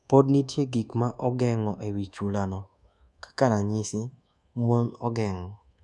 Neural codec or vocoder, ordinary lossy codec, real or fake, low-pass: codec, 24 kHz, 1.2 kbps, DualCodec; none; fake; none